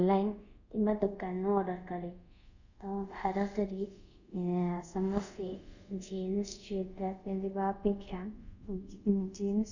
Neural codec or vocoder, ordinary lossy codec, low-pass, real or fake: codec, 24 kHz, 0.5 kbps, DualCodec; none; 7.2 kHz; fake